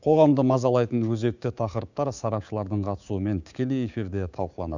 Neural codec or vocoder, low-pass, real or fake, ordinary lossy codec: codec, 16 kHz, 6 kbps, DAC; 7.2 kHz; fake; none